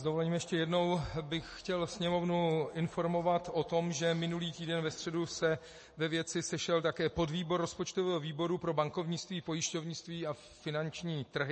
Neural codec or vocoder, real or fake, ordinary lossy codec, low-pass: none; real; MP3, 32 kbps; 10.8 kHz